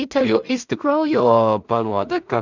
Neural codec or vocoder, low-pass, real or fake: codec, 16 kHz in and 24 kHz out, 0.4 kbps, LongCat-Audio-Codec, two codebook decoder; 7.2 kHz; fake